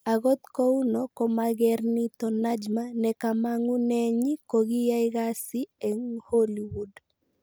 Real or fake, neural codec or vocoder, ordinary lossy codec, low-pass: real; none; none; none